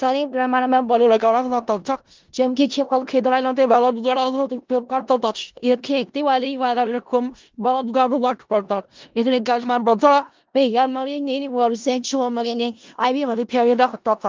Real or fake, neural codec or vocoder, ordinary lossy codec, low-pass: fake; codec, 16 kHz in and 24 kHz out, 0.4 kbps, LongCat-Audio-Codec, four codebook decoder; Opus, 32 kbps; 7.2 kHz